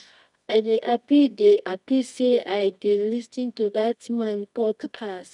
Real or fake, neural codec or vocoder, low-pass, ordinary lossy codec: fake; codec, 24 kHz, 0.9 kbps, WavTokenizer, medium music audio release; 10.8 kHz; none